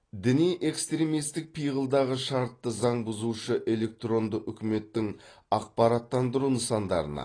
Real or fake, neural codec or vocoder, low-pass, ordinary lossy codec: real; none; 9.9 kHz; AAC, 32 kbps